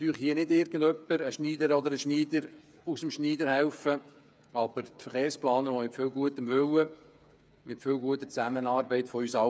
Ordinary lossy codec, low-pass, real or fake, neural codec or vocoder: none; none; fake; codec, 16 kHz, 8 kbps, FreqCodec, smaller model